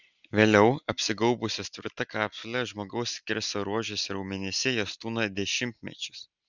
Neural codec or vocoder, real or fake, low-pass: none; real; 7.2 kHz